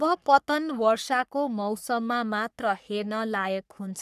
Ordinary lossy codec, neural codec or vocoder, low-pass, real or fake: none; codec, 44.1 kHz, 3.4 kbps, Pupu-Codec; 14.4 kHz; fake